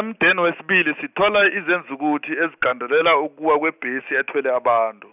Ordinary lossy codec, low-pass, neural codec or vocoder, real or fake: none; 3.6 kHz; none; real